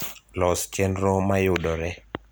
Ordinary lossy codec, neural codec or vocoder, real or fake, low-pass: none; none; real; none